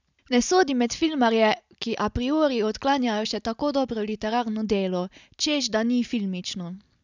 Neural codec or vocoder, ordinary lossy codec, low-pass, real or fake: none; none; 7.2 kHz; real